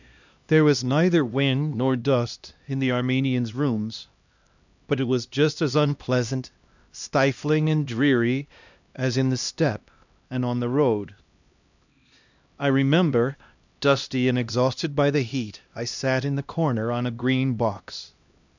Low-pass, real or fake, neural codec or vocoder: 7.2 kHz; fake; codec, 16 kHz, 1 kbps, X-Codec, HuBERT features, trained on LibriSpeech